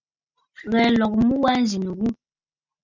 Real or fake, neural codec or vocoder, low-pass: real; none; 7.2 kHz